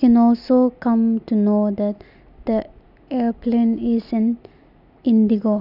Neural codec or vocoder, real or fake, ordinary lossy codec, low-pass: none; real; none; 5.4 kHz